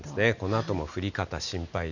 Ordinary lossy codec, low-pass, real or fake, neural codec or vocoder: none; 7.2 kHz; real; none